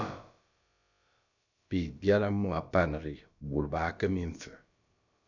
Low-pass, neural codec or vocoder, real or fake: 7.2 kHz; codec, 16 kHz, about 1 kbps, DyCAST, with the encoder's durations; fake